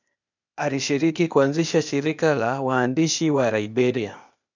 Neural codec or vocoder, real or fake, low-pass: codec, 16 kHz, 0.8 kbps, ZipCodec; fake; 7.2 kHz